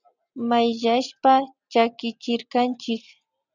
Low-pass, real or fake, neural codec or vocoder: 7.2 kHz; real; none